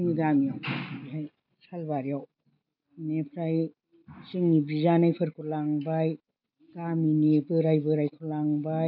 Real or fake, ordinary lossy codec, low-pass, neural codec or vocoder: real; none; 5.4 kHz; none